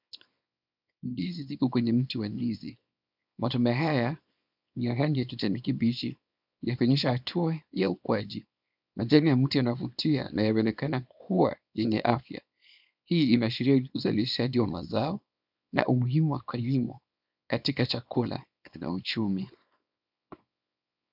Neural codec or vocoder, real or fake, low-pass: codec, 24 kHz, 0.9 kbps, WavTokenizer, small release; fake; 5.4 kHz